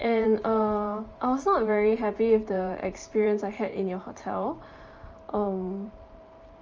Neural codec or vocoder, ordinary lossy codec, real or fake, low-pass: vocoder, 44.1 kHz, 128 mel bands every 512 samples, BigVGAN v2; Opus, 24 kbps; fake; 7.2 kHz